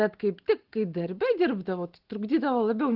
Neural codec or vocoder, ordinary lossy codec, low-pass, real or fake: none; Opus, 16 kbps; 5.4 kHz; real